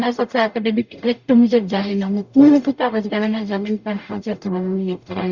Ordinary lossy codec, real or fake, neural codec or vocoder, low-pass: Opus, 64 kbps; fake; codec, 44.1 kHz, 0.9 kbps, DAC; 7.2 kHz